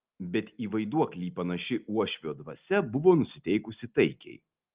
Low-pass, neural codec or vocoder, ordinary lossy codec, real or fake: 3.6 kHz; none; Opus, 32 kbps; real